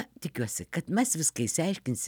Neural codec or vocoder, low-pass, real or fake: none; 19.8 kHz; real